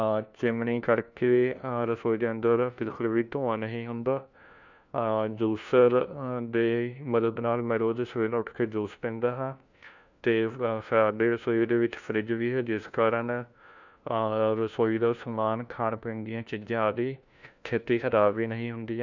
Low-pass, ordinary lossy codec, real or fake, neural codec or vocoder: 7.2 kHz; none; fake; codec, 16 kHz, 1 kbps, FunCodec, trained on LibriTTS, 50 frames a second